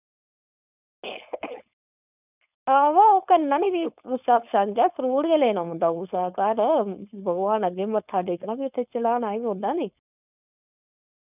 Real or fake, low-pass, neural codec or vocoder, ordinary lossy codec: fake; 3.6 kHz; codec, 16 kHz, 4.8 kbps, FACodec; none